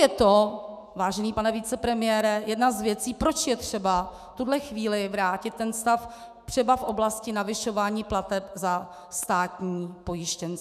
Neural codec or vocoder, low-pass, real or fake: autoencoder, 48 kHz, 128 numbers a frame, DAC-VAE, trained on Japanese speech; 14.4 kHz; fake